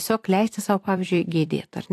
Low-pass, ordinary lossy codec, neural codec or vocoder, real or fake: 14.4 kHz; AAC, 64 kbps; vocoder, 44.1 kHz, 128 mel bands every 256 samples, BigVGAN v2; fake